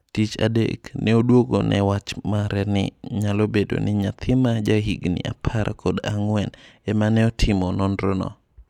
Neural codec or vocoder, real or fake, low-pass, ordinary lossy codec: none; real; 19.8 kHz; none